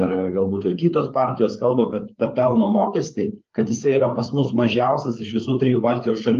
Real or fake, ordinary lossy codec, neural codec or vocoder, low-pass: fake; Opus, 32 kbps; codec, 16 kHz, 4 kbps, FreqCodec, larger model; 7.2 kHz